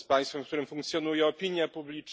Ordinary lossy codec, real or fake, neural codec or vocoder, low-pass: none; real; none; none